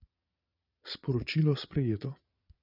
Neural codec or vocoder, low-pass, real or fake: vocoder, 22.05 kHz, 80 mel bands, Vocos; 5.4 kHz; fake